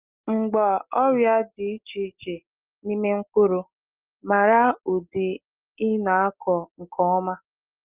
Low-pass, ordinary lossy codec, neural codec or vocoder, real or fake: 3.6 kHz; Opus, 24 kbps; none; real